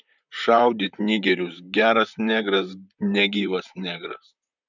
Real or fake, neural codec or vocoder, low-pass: fake; vocoder, 44.1 kHz, 128 mel bands, Pupu-Vocoder; 7.2 kHz